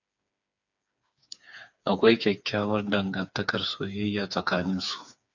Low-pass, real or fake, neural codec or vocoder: 7.2 kHz; fake; codec, 16 kHz, 4 kbps, FreqCodec, smaller model